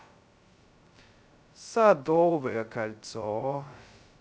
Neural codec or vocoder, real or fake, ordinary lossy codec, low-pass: codec, 16 kHz, 0.2 kbps, FocalCodec; fake; none; none